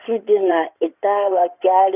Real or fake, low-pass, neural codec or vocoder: fake; 3.6 kHz; codec, 16 kHz in and 24 kHz out, 2.2 kbps, FireRedTTS-2 codec